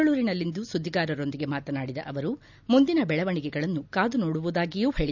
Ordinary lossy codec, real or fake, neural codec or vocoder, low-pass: none; real; none; 7.2 kHz